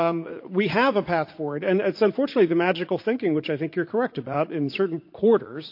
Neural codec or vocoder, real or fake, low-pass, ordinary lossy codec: vocoder, 44.1 kHz, 80 mel bands, Vocos; fake; 5.4 kHz; MP3, 32 kbps